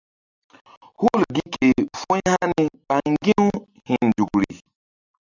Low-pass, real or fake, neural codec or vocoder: 7.2 kHz; real; none